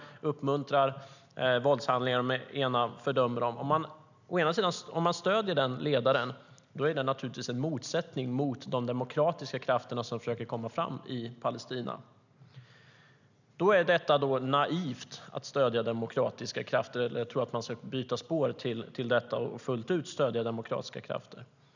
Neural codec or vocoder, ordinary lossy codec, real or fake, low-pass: none; none; real; 7.2 kHz